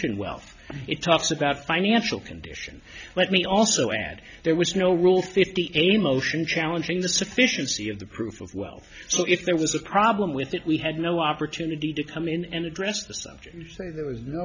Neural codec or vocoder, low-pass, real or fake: none; 7.2 kHz; real